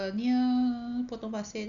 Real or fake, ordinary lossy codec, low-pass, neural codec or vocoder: real; none; 7.2 kHz; none